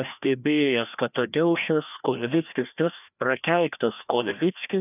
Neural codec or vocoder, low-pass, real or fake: codec, 16 kHz, 1 kbps, FreqCodec, larger model; 3.6 kHz; fake